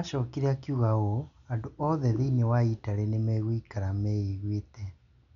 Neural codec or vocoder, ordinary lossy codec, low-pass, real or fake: none; none; 7.2 kHz; real